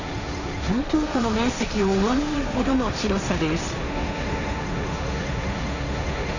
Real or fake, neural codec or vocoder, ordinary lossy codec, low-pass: fake; codec, 16 kHz, 1.1 kbps, Voila-Tokenizer; none; 7.2 kHz